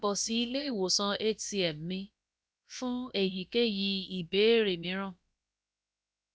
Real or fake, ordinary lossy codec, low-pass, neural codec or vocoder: fake; none; none; codec, 16 kHz, about 1 kbps, DyCAST, with the encoder's durations